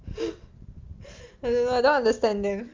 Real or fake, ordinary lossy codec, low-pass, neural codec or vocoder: real; Opus, 24 kbps; 7.2 kHz; none